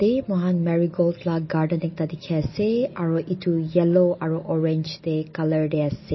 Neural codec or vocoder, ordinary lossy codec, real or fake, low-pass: none; MP3, 24 kbps; real; 7.2 kHz